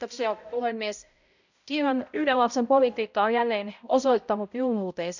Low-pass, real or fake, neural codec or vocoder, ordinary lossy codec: 7.2 kHz; fake; codec, 16 kHz, 0.5 kbps, X-Codec, HuBERT features, trained on balanced general audio; none